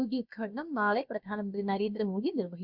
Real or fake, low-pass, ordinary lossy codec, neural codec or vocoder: fake; 5.4 kHz; none; codec, 16 kHz, about 1 kbps, DyCAST, with the encoder's durations